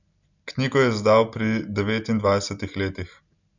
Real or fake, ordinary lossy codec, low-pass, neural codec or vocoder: real; none; 7.2 kHz; none